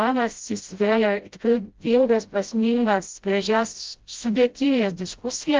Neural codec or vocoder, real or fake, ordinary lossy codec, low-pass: codec, 16 kHz, 0.5 kbps, FreqCodec, smaller model; fake; Opus, 32 kbps; 7.2 kHz